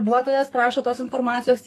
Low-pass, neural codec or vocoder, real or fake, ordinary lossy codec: 14.4 kHz; codec, 32 kHz, 1.9 kbps, SNAC; fake; AAC, 48 kbps